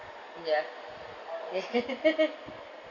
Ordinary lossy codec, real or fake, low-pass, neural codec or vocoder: none; real; 7.2 kHz; none